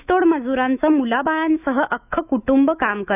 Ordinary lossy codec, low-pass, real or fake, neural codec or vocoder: none; 3.6 kHz; fake; autoencoder, 48 kHz, 128 numbers a frame, DAC-VAE, trained on Japanese speech